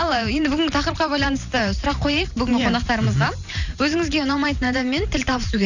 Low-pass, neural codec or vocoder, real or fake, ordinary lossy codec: 7.2 kHz; vocoder, 44.1 kHz, 128 mel bands every 512 samples, BigVGAN v2; fake; none